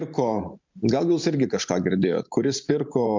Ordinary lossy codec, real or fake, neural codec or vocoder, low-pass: MP3, 64 kbps; fake; vocoder, 44.1 kHz, 128 mel bands every 512 samples, BigVGAN v2; 7.2 kHz